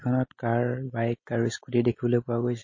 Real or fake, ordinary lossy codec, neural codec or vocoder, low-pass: real; MP3, 32 kbps; none; 7.2 kHz